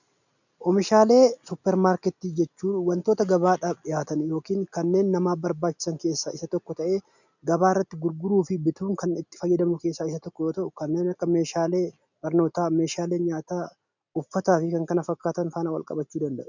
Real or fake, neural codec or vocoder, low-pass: real; none; 7.2 kHz